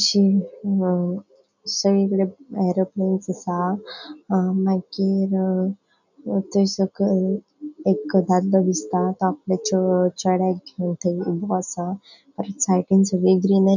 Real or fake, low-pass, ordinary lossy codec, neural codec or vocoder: real; 7.2 kHz; none; none